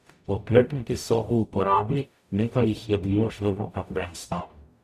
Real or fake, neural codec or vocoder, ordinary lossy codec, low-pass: fake; codec, 44.1 kHz, 0.9 kbps, DAC; none; 14.4 kHz